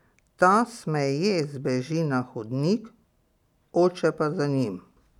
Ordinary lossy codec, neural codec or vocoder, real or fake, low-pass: none; none; real; 19.8 kHz